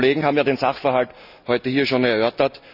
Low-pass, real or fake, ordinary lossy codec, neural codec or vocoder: 5.4 kHz; real; none; none